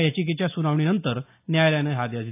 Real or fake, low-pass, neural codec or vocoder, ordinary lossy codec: real; 3.6 kHz; none; AAC, 24 kbps